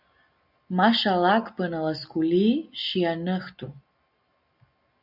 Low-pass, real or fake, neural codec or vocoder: 5.4 kHz; real; none